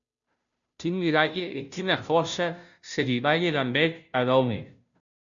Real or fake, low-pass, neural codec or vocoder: fake; 7.2 kHz; codec, 16 kHz, 0.5 kbps, FunCodec, trained on Chinese and English, 25 frames a second